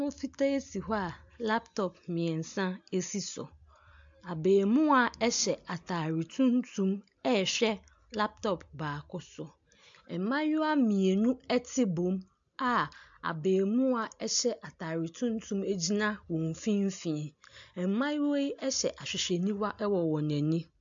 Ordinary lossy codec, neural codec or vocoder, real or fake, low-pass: AAC, 64 kbps; none; real; 7.2 kHz